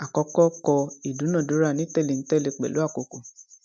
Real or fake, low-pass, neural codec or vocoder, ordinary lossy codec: real; none; none; none